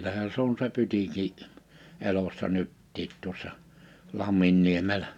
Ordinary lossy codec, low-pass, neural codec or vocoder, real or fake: none; 19.8 kHz; none; real